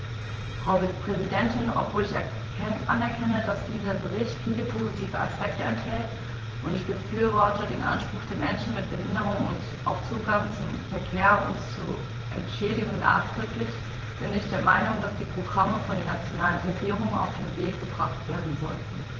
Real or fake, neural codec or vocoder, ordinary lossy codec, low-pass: fake; vocoder, 44.1 kHz, 80 mel bands, Vocos; Opus, 16 kbps; 7.2 kHz